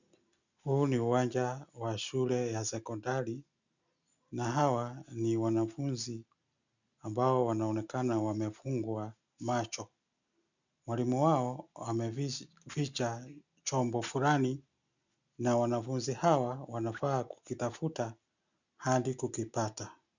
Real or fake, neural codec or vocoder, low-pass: real; none; 7.2 kHz